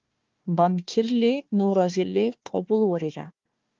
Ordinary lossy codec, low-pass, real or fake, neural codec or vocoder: Opus, 32 kbps; 7.2 kHz; fake; codec, 16 kHz, 1 kbps, FunCodec, trained on Chinese and English, 50 frames a second